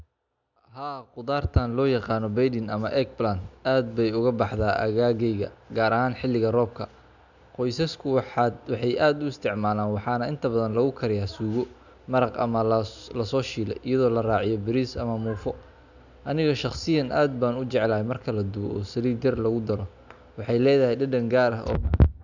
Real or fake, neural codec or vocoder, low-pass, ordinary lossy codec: real; none; 7.2 kHz; none